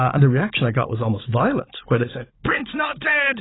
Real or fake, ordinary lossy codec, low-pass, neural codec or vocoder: fake; AAC, 16 kbps; 7.2 kHz; codec, 16 kHz, 16 kbps, FunCodec, trained on Chinese and English, 50 frames a second